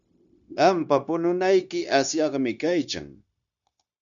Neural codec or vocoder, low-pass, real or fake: codec, 16 kHz, 0.9 kbps, LongCat-Audio-Codec; 7.2 kHz; fake